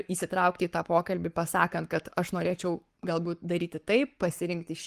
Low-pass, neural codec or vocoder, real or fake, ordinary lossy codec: 14.4 kHz; codec, 44.1 kHz, 7.8 kbps, Pupu-Codec; fake; Opus, 24 kbps